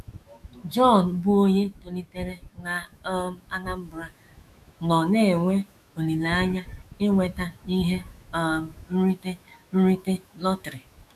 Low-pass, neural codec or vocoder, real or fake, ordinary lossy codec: 14.4 kHz; autoencoder, 48 kHz, 128 numbers a frame, DAC-VAE, trained on Japanese speech; fake; none